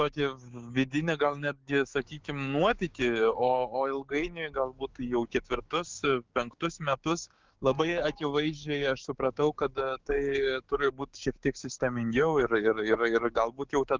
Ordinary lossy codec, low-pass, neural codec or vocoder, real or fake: Opus, 32 kbps; 7.2 kHz; codec, 16 kHz, 6 kbps, DAC; fake